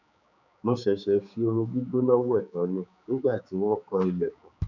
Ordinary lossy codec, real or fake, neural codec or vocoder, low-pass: none; fake; codec, 16 kHz, 4 kbps, X-Codec, HuBERT features, trained on general audio; 7.2 kHz